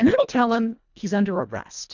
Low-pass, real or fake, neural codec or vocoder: 7.2 kHz; fake; codec, 24 kHz, 1.5 kbps, HILCodec